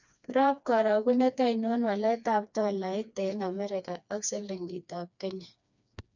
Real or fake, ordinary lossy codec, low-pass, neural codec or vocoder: fake; none; 7.2 kHz; codec, 16 kHz, 2 kbps, FreqCodec, smaller model